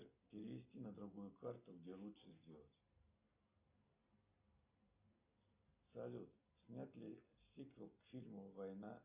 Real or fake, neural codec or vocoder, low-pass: real; none; 3.6 kHz